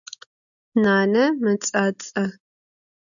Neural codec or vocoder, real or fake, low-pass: none; real; 7.2 kHz